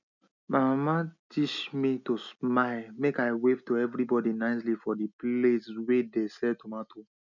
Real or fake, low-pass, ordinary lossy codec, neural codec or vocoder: real; 7.2 kHz; none; none